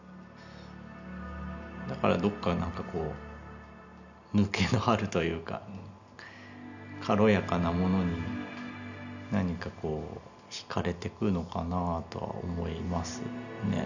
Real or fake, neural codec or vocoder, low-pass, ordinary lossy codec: real; none; 7.2 kHz; none